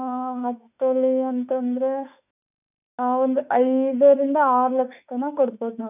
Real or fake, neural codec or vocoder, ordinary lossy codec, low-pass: fake; autoencoder, 48 kHz, 32 numbers a frame, DAC-VAE, trained on Japanese speech; none; 3.6 kHz